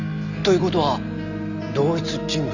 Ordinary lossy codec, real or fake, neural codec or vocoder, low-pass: none; real; none; 7.2 kHz